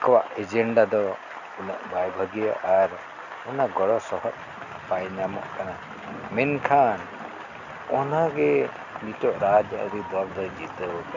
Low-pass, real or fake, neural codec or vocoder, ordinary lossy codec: 7.2 kHz; real; none; none